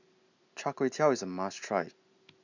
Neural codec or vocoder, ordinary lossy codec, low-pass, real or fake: none; none; 7.2 kHz; real